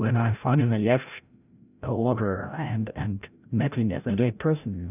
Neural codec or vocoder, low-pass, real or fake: codec, 16 kHz, 0.5 kbps, FreqCodec, larger model; 3.6 kHz; fake